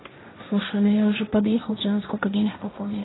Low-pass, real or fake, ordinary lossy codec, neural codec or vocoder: 7.2 kHz; fake; AAC, 16 kbps; codec, 16 kHz, 1.1 kbps, Voila-Tokenizer